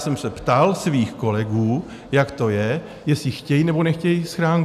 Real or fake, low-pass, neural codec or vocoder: real; 14.4 kHz; none